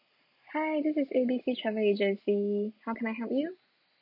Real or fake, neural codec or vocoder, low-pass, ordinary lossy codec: real; none; 5.4 kHz; none